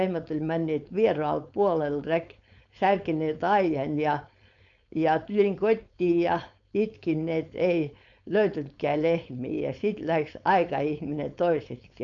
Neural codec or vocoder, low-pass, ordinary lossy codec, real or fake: codec, 16 kHz, 4.8 kbps, FACodec; 7.2 kHz; none; fake